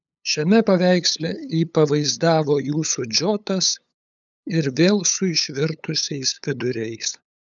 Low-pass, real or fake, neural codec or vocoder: 7.2 kHz; fake; codec, 16 kHz, 8 kbps, FunCodec, trained on LibriTTS, 25 frames a second